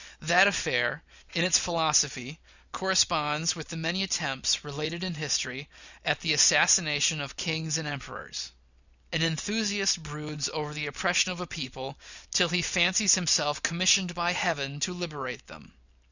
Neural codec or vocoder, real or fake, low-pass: none; real; 7.2 kHz